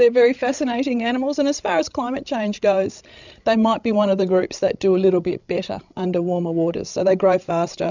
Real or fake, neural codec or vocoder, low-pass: fake; codec, 16 kHz, 16 kbps, FreqCodec, larger model; 7.2 kHz